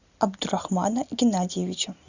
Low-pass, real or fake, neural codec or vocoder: 7.2 kHz; real; none